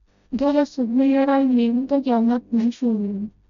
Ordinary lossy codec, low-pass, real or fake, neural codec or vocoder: none; 7.2 kHz; fake; codec, 16 kHz, 0.5 kbps, FreqCodec, smaller model